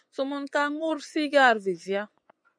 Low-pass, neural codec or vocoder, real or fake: 9.9 kHz; vocoder, 24 kHz, 100 mel bands, Vocos; fake